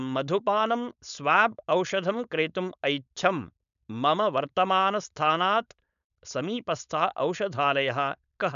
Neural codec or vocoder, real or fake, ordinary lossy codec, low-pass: codec, 16 kHz, 4.8 kbps, FACodec; fake; none; 7.2 kHz